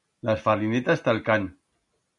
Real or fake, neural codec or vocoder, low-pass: real; none; 10.8 kHz